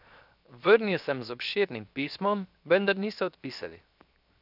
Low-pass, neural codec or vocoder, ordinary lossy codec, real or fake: 5.4 kHz; codec, 16 kHz, 0.7 kbps, FocalCodec; none; fake